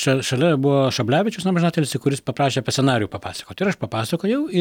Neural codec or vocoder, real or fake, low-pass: none; real; 19.8 kHz